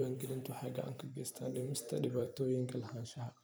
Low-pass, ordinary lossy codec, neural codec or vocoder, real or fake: none; none; vocoder, 44.1 kHz, 128 mel bands every 256 samples, BigVGAN v2; fake